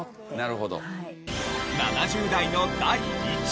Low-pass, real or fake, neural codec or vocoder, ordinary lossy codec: none; real; none; none